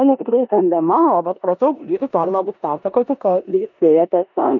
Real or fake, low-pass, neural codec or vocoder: fake; 7.2 kHz; codec, 16 kHz in and 24 kHz out, 0.9 kbps, LongCat-Audio-Codec, four codebook decoder